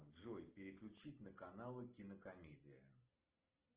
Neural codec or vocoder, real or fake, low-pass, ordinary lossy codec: none; real; 3.6 kHz; Opus, 16 kbps